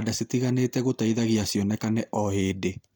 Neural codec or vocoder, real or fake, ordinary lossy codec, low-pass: none; real; none; none